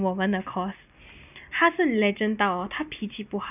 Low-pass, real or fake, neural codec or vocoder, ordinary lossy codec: 3.6 kHz; real; none; none